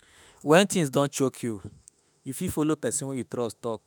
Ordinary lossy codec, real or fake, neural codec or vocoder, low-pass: none; fake; autoencoder, 48 kHz, 32 numbers a frame, DAC-VAE, trained on Japanese speech; none